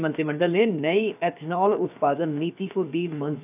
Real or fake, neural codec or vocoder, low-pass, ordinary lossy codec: fake; codec, 16 kHz, about 1 kbps, DyCAST, with the encoder's durations; 3.6 kHz; none